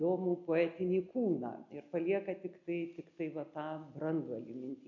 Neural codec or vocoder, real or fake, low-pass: none; real; 7.2 kHz